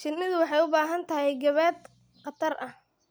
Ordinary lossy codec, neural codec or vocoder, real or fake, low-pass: none; none; real; none